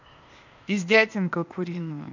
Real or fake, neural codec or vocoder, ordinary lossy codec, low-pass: fake; codec, 16 kHz, 0.8 kbps, ZipCodec; none; 7.2 kHz